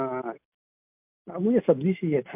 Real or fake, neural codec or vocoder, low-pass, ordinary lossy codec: real; none; 3.6 kHz; MP3, 32 kbps